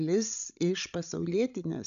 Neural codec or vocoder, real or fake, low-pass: codec, 16 kHz, 16 kbps, FreqCodec, larger model; fake; 7.2 kHz